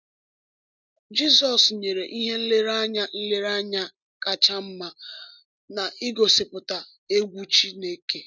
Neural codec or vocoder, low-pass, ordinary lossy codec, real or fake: none; 7.2 kHz; none; real